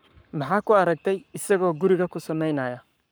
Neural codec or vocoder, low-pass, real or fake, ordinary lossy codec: codec, 44.1 kHz, 7.8 kbps, Pupu-Codec; none; fake; none